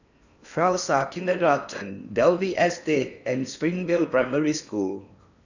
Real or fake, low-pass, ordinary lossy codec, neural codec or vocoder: fake; 7.2 kHz; none; codec, 16 kHz in and 24 kHz out, 0.8 kbps, FocalCodec, streaming, 65536 codes